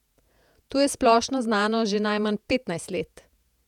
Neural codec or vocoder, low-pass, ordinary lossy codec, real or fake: vocoder, 48 kHz, 128 mel bands, Vocos; 19.8 kHz; none; fake